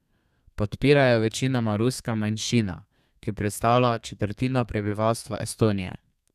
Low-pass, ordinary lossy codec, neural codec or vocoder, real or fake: 14.4 kHz; none; codec, 32 kHz, 1.9 kbps, SNAC; fake